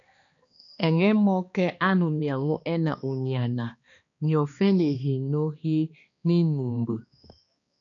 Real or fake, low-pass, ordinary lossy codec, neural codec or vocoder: fake; 7.2 kHz; AAC, 64 kbps; codec, 16 kHz, 2 kbps, X-Codec, HuBERT features, trained on balanced general audio